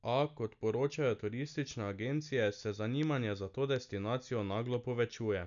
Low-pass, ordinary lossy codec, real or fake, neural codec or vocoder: 7.2 kHz; none; real; none